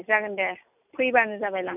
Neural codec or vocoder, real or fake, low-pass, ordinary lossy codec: none; real; 3.6 kHz; none